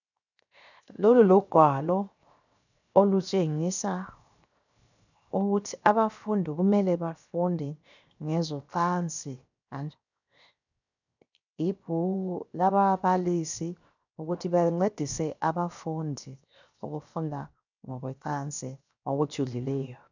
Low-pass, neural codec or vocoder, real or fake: 7.2 kHz; codec, 16 kHz, 0.7 kbps, FocalCodec; fake